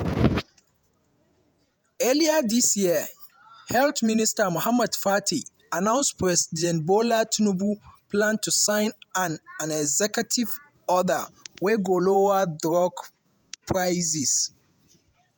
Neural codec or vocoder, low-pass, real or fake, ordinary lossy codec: vocoder, 48 kHz, 128 mel bands, Vocos; none; fake; none